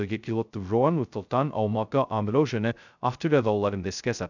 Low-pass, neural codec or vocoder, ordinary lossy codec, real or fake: 7.2 kHz; codec, 16 kHz, 0.2 kbps, FocalCodec; none; fake